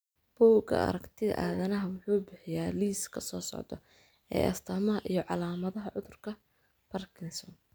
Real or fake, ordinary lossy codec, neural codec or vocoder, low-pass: real; none; none; none